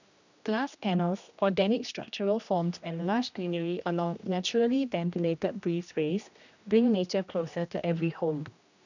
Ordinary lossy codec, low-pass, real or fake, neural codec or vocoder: none; 7.2 kHz; fake; codec, 16 kHz, 1 kbps, X-Codec, HuBERT features, trained on general audio